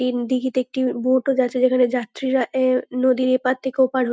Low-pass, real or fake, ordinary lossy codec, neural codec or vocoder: none; real; none; none